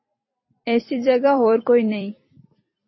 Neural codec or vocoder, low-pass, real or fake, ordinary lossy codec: vocoder, 44.1 kHz, 128 mel bands every 256 samples, BigVGAN v2; 7.2 kHz; fake; MP3, 24 kbps